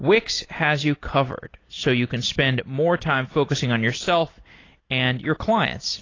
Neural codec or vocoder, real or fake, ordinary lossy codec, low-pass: none; real; AAC, 32 kbps; 7.2 kHz